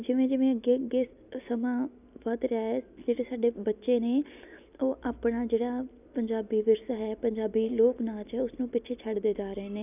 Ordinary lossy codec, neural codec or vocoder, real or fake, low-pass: none; none; real; 3.6 kHz